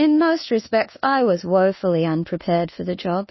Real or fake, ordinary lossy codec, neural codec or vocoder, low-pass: fake; MP3, 24 kbps; codec, 24 kHz, 1.2 kbps, DualCodec; 7.2 kHz